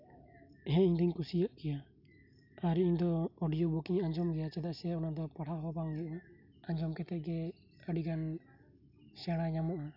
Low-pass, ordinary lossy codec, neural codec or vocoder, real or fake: 5.4 kHz; none; none; real